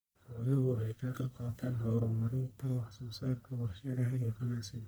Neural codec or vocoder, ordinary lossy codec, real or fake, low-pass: codec, 44.1 kHz, 1.7 kbps, Pupu-Codec; none; fake; none